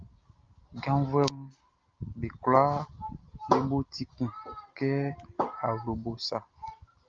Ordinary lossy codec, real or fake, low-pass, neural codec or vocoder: Opus, 32 kbps; real; 7.2 kHz; none